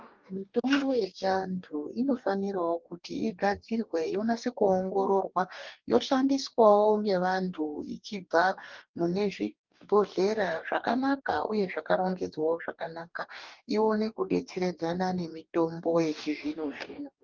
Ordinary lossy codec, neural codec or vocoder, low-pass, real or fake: Opus, 32 kbps; codec, 44.1 kHz, 2.6 kbps, DAC; 7.2 kHz; fake